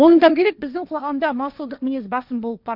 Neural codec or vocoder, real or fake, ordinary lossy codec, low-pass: codec, 16 kHz, 1.1 kbps, Voila-Tokenizer; fake; none; 5.4 kHz